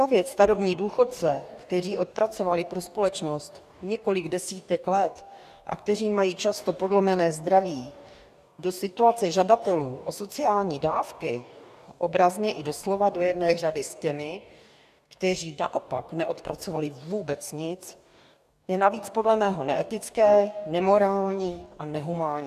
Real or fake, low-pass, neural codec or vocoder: fake; 14.4 kHz; codec, 44.1 kHz, 2.6 kbps, DAC